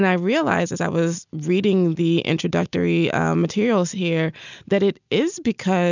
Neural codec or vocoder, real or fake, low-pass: none; real; 7.2 kHz